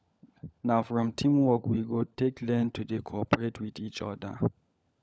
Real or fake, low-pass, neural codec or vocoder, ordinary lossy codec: fake; none; codec, 16 kHz, 16 kbps, FunCodec, trained on LibriTTS, 50 frames a second; none